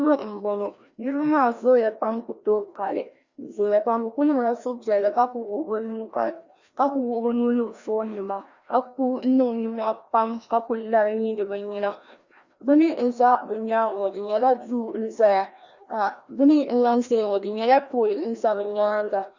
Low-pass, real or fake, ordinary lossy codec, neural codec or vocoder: 7.2 kHz; fake; Opus, 64 kbps; codec, 16 kHz, 1 kbps, FreqCodec, larger model